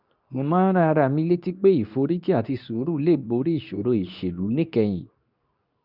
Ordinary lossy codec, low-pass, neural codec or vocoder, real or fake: none; 5.4 kHz; codec, 24 kHz, 0.9 kbps, WavTokenizer, medium speech release version 2; fake